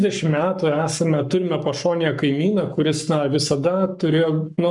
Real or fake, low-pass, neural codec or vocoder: fake; 10.8 kHz; codec, 44.1 kHz, 7.8 kbps, Pupu-Codec